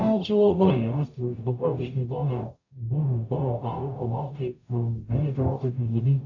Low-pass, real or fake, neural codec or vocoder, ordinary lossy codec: 7.2 kHz; fake; codec, 44.1 kHz, 0.9 kbps, DAC; AAC, 48 kbps